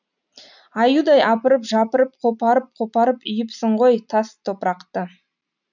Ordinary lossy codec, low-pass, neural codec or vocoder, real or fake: none; 7.2 kHz; none; real